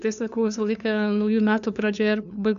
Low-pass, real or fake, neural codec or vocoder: 7.2 kHz; fake; codec, 16 kHz, 2 kbps, FunCodec, trained on LibriTTS, 25 frames a second